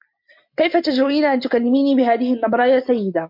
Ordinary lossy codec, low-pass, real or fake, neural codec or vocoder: MP3, 48 kbps; 5.4 kHz; real; none